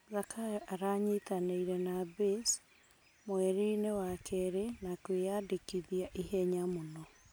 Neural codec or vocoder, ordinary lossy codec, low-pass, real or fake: none; none; none; real